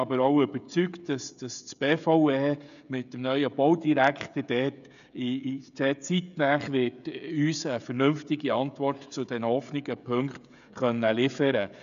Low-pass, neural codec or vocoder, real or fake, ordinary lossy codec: 7.2 kHz; codec, 16 kHz, 16 kbps, FreqCodec, smaller model; fake; none